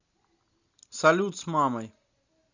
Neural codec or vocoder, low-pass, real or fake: none; 7.2 kHz; real